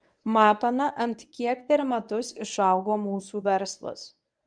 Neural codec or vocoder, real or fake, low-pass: codec, 24 kHz, 0.9 kbps, WavTokenizer, medium speech release version 1; fake; 9.9 kHz